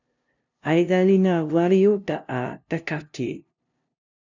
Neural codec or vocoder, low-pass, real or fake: codec, 16 kHz, 0.5 kbps, FunCodec, trained on LibriTTS, 25 frames a second; 7.2 kHz; fake